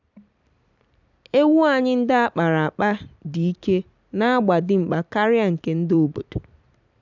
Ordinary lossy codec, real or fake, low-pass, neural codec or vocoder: none; fake; 7.2 kHz; vocoder, 44.1 kHz, 128 mel bands every 256 samples, BigVGAN v2